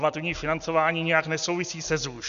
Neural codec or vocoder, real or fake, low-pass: none; real; 7.2 kHz